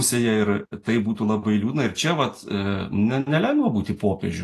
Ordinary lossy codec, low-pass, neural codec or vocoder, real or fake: AAC, 48 kbps; 14.4 kHz; none; real